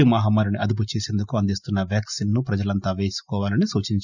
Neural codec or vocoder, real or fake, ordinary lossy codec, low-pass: none; real; none; 7.2 kHz